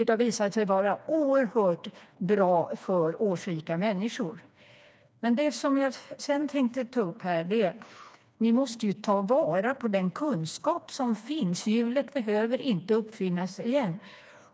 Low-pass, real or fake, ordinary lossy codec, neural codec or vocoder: none; fake; none; codec, 16 kHz, 2 kbps, FreqCodec, smaller model